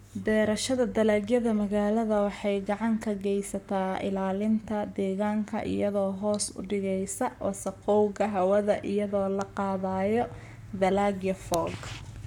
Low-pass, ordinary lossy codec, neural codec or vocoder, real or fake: 19.8 kHz; none; codec, 44.1 kHz, 7.8 kbps, Pupu-Codec; fake